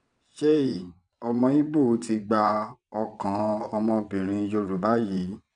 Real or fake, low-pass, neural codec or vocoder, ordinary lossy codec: fake; 9.9 kHz; vocoder, 22.05 kHz, 80 mel bands, WaveNeXt; none